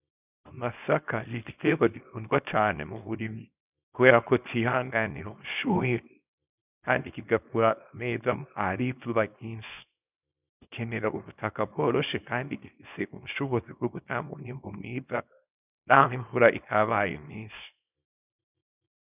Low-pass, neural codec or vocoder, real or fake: 3.6 kHz; codec, 24 kHz, 0.9 kbps, WavTokenizer, small release; fake